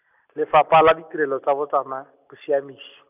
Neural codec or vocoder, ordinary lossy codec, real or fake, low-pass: none; none; real; 3.6 kHz